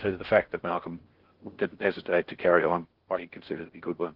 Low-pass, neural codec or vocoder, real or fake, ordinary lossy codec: 5.4 kHz; codec, 16 kHz in and 24 kHz out, 0.8 kbps, FocalCodec, streaming, 65536 codes; fake; Opus, 16 kbps